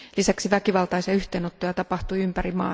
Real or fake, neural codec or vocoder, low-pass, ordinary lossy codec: real; none; none; none